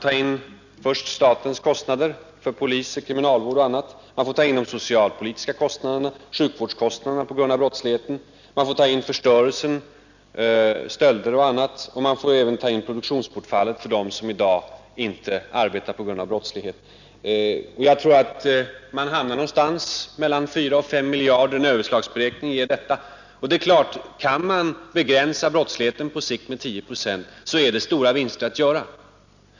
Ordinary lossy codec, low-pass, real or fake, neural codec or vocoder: none; 7.2 kHz; real; none